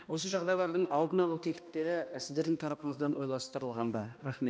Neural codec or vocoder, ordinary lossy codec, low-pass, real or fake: codec, 16 kHz, 1 kbps, X-Codec, HuBERT features, trained on balanced general audio; none; none; fake